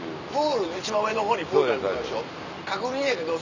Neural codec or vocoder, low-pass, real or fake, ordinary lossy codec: none; 7.2 kHz; real; none